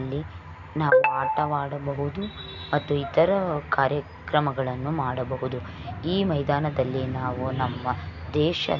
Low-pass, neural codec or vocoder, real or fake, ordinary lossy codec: 7.2 kHz; none; real; none